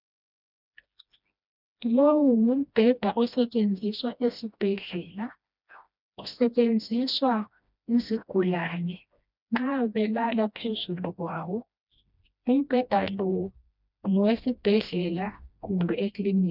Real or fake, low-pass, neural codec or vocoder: fake; 5.4 kHz; codec, 16 kHz, 1 kbps, FreqCodec, smaller model